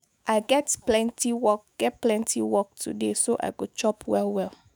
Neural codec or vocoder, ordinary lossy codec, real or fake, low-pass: autoencoder, 48 kHz, 128 numbers a frame, DAC-VAE, trained on Japanese speech; none; fake; none